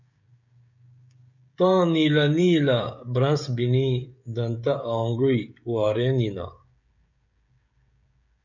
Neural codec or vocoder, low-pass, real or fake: codec, 16 kHz, 16 kbps, FreqCodec, smaller model; 7.2 kHz; fake